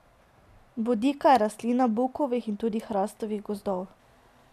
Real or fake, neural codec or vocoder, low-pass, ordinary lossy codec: real; none; 14.4 kHz; none